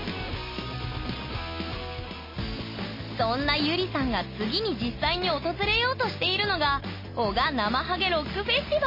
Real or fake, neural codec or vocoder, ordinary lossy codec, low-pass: real; none; MP3, 24 kbps; 5.4 kHz